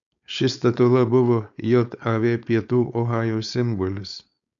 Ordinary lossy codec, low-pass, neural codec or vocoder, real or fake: MP3, 96 kbps; 7.2 kHz; codec, 16 kHz, 4.8 kbps, FACodec; fake